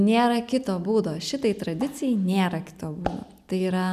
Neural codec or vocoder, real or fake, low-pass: none; real; 14.4 kHz